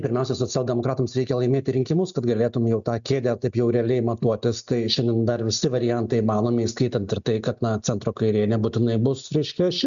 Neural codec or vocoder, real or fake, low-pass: none; real; 7.2 kHz